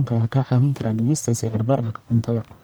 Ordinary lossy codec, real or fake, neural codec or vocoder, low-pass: none; fake; codec, 44.1 kHz, 1.7 kbps, Pupu-Codec; none